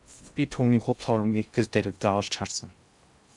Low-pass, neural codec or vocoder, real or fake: 10.8 kHz; codec, 16 kHz in and 24 kHz out, 0.6 kbps, FocalCodec, streaming, 2048 codes; fake